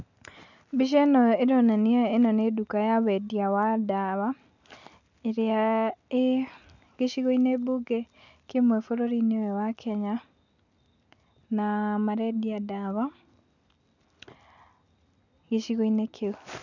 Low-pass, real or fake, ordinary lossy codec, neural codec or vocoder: 7.2 kHz; real; none; none